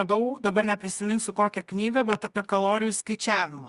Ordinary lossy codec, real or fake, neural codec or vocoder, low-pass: Opus, 64 kbps; fake; codec, 24 kHz, 0.9 kbps, WavTokenizer, medium music audio release; 10.8 kHz